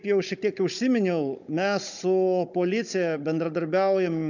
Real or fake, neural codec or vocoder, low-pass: fake; codec, 16 kHz, 16 kbps, FunCodec, trained on Chinese and English, 50 frames a second; 7.2 kHz